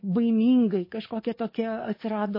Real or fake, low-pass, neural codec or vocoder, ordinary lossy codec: fake; 5.4 kHz; codec, 44.1 kHz, 7.8 kbps, DAC; MP3, 24 kbps